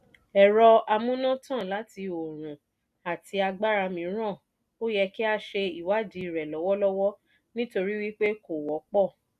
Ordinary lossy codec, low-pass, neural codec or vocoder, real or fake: none; 14.4 kHz; none; real